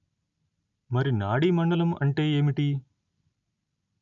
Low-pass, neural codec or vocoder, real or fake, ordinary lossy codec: 7.2 kHz; none; real; none